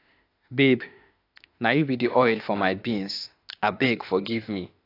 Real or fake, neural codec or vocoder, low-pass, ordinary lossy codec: fake; autoencoder, 48 kHz, 32 numbers a frame, DAC-VAE, trained on Japanese speech; 5.4 kHz; AAC, 32 kbps